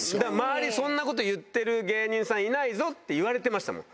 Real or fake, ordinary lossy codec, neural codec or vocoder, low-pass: real; none; none; none